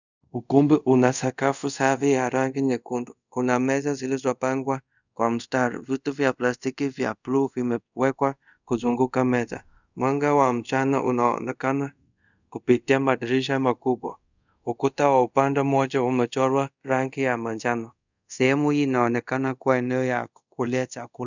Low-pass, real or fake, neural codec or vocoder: 7.2 kHz; fake; codec, 24 kHz, 0.5 kbps, DualCodec